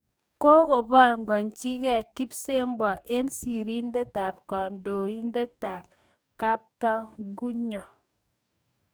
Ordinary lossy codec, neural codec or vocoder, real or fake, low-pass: none; codec, 44.1 kHz, 2.6 kbps, DAC; fake; none